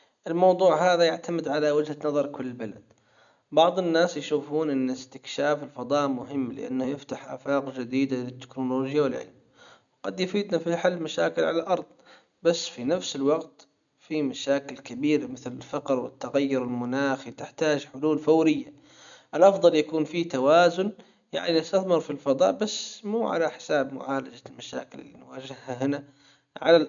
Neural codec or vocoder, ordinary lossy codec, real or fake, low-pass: none; none; real; 7.2 kHz